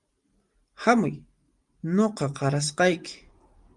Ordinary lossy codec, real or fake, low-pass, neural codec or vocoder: Opus, 32 kbps; fake; 10.8 kHz; vocoder, 44.1 kHz, 128 mel bands every 512 samples, BigVGAN v2